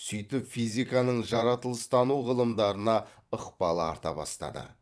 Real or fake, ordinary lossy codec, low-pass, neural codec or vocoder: fake; none; none; vocoder, 22.05 kHz, 80 mel bands, WaveNeXt